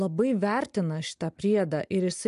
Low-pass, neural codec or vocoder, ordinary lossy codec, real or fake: 10.8 kHz; none; MP3, 64 kbps; real